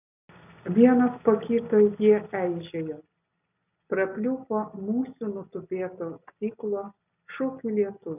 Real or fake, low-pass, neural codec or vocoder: real; 3.6 kHz; none